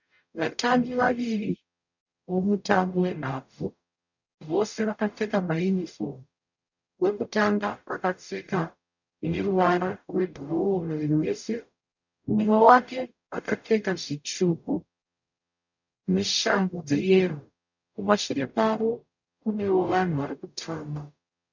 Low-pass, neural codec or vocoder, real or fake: 7.2 kHz; codec, 44.1 kHz, 0.9 kbps, DAC; fake